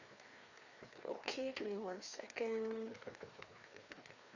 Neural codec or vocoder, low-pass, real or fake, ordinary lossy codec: codec, 16 kHz, 2 kbps, FreqCodec, larger model; 7.2 kHz; fake; Opus, 64 kbps